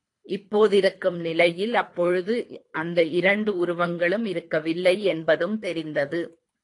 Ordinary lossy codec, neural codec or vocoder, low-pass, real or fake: AAC, 48 kbps; codec, 24 kHz, 3 kbps, HILCodec; 10.8 kHz; fake